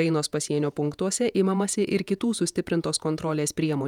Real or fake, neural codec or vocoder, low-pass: fake; vocoder, 48 kHz, 128 mel bands, Vocos; 19.8 kHz